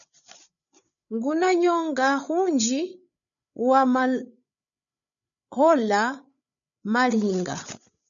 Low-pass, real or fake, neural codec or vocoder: 7.2 kHz; fake; codec, 16 kHz, 8 kbps, FreqCodec, larger model